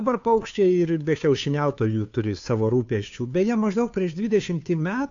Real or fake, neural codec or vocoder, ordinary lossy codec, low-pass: fake; codec, 16 kHz, 2 kbps, FunCodec, trained on Chinese and English, 25 frames a second; AAC, 64 kbps; 7.2 kHz